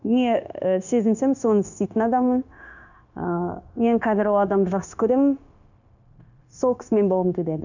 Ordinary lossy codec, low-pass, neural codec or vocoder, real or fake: none; 7.2 kHz; codec, 16 kHz, 0.9 kbps, LongCat-Audio-Codec; fake